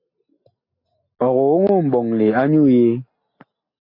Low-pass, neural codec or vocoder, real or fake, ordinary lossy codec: 5.4 kHz; none; real; AAC, 32 kbps